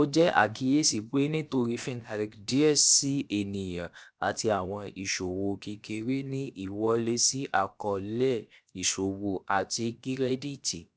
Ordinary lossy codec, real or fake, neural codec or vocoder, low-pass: none; fake; codec, 16 kHz, about 1 kbps, DyCAST, with the encoder's durations; none